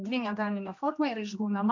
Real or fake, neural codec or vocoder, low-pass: fake; codec, 16 kHz, 1 kbps, X-Codec, HuBERT features, trained on general audio; 7.2 kHz